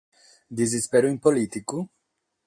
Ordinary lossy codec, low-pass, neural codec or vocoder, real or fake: AAC, 64 kbps; 9.9 kHz; none; real